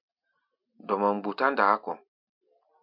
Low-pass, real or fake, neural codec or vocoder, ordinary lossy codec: 5.4 kHz; real; none; MP3, 48 kbps